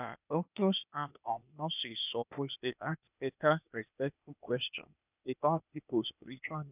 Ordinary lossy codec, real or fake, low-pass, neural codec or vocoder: none; fake; 3.6 kHz; codec, 16 kHz, 0.8 kbps, ZipCodec